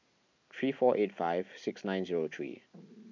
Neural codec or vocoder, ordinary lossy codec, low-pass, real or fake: none; MP3, 64 kbps; 7.2 kHz; real